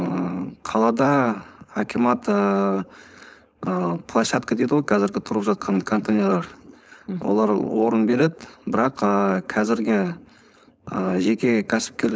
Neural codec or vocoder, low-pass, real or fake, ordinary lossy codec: codec, 16 kHz, 4.8 kbps, FACodec; none; fake; none